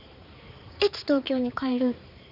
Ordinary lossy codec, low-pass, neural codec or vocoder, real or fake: none; 5.4 kHz; codec, 16 kHz, 4 kbps, X-Codec, HuBERT features, trained on balanced general audio; fake